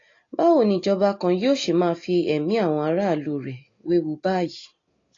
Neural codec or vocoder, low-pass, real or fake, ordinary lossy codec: none; 7.2 kHz; real; AAC, 32 kbps